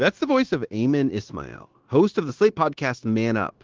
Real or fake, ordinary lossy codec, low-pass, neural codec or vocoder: fake; Opus, 16 kbps; 7.2 kHz; codec, 16 kHz, 0.9 kbps, LongCat-Audio-Codec